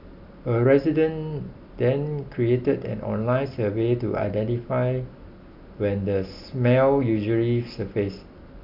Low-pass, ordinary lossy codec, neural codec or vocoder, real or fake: 5.4 kHz; none; none; real